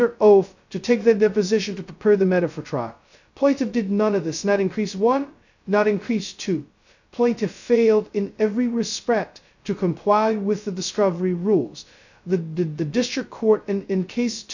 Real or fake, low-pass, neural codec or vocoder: fake; 7.2 kHz; codec, 16 kHz, 0.2 kbps, FocalCodec